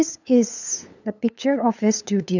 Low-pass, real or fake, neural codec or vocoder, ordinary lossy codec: 7.2 kHz; fake; codec, 16 kHz, 8 kbps, FunCodec, trained on LibriTTS, 25 frames a second; none